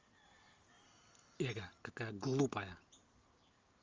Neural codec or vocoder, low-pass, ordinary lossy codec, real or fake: none; 7.2 kHz; Opus, 32 kbps; real